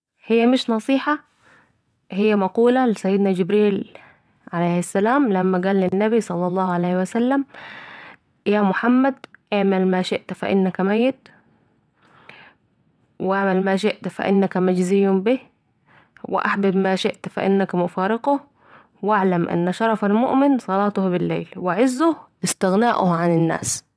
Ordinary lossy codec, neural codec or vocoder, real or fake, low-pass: none; vocoder, 22.05 kHz, 80 mel bands, WaveNeXt; fake; none